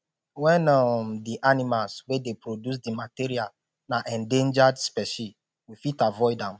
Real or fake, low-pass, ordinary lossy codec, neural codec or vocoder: real; none; none; none